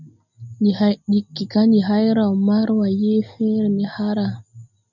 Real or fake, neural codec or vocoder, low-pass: real; none; 7.2 kHz